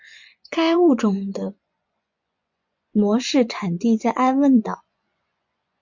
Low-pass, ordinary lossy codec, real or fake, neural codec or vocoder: 7.2 kHz; MP3, 64 kbps; fake; vocoder, 24 kHz, 100 mel bands, Vocos